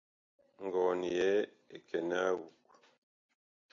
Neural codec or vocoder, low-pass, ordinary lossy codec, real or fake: none; 7.2 kHz; AAC, 48 kbps; real